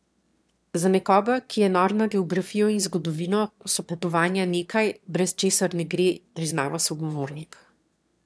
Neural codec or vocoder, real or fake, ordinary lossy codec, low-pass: autoencoder, 22.05 kHz, a latent of 192 numbers a frame, VITS, trained on one speaker; fake; none; none